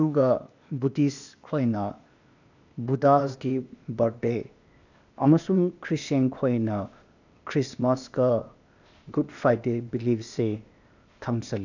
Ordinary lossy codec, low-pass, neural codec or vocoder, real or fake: none; 7.2 kHz; codec, 16 kHz, 0.8 kbps, ZipCodec; fake